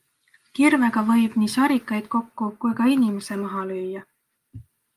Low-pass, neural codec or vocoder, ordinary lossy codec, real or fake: 14.4 kHz; none; Opus, 32 kbps; real